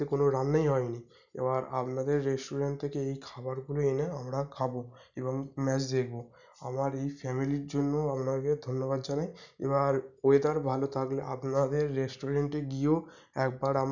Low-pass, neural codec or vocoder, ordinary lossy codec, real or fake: 7.2 kHz; none; none; real